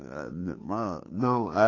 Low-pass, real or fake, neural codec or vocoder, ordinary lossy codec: 7.2 kHz; fake; codec, 16 kHz, 2 kbps, FunCodec, trained on Chinese and English, 25 frames a second; AAC, 32 kbps